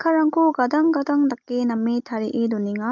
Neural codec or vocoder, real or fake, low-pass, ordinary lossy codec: none; real; 7.2 kHz; Opus, 24 kbps